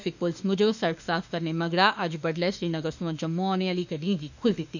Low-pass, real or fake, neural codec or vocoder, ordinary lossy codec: 7.2 kHz; fake; autoencoder, 48 kHz, 32 numbers a frame, DAC-VAE, trained on Japanese speech; none